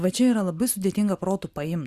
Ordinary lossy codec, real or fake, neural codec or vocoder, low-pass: Opus, 64 kbps; real; none; 14.4 kHz